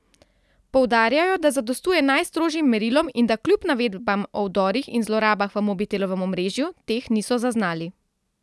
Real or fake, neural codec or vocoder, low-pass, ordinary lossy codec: real; none; none; none